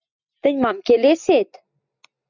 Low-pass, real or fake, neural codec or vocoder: 7.2 kHz; real; none